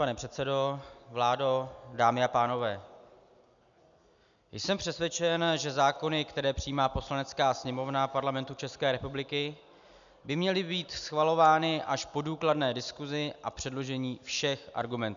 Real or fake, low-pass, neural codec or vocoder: real; 7.2 kHz; none